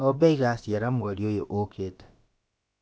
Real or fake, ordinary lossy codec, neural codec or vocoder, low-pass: fake; none; codec, 16 kHz, about 1 kbps, DyCAST, with the encoder's durations; none